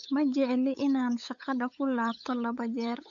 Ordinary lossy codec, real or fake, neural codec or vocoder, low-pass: AAC, 64 kbps; fake; codec, 16 kHz, 8 kbps, FunCodec, trained on LibriTTS, 25 frames a second; 7.2 kHz